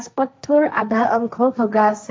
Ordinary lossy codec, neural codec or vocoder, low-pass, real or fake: none; codec, 16 kHz, 1.1 kbps, Voila-Tokenizer; none; fake